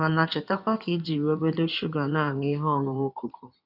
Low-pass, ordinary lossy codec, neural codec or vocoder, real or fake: 5.4 kHz; none; codec, 16 kHz in and 24 kHz out, 2.2 kbps, FireRedTTS-2 codec; fake